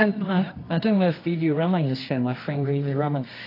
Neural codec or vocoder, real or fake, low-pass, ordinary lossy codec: codec, 24 kHz, 0.9 kbps, WavTokenizer, medium music audio release; fake; 5.4 kHz; AAC, 32 kbps